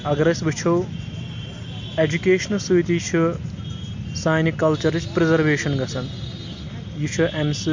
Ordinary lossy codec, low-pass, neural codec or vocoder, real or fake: MP3, 48 kbps; 7.2 kHz; none; real